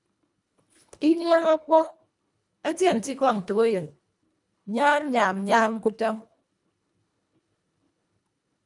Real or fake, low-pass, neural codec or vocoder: fake; 10.8 kHz; codec, 24 kHz, 1.5 kbps, HILCodec